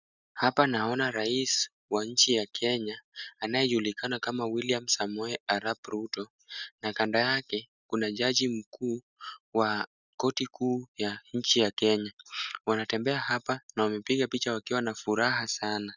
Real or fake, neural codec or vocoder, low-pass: real; none; 7.2 kHz